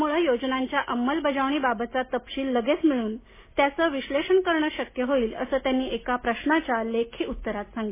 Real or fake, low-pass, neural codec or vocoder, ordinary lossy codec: real; 3.6 kHz; none; MP3, 16 kbps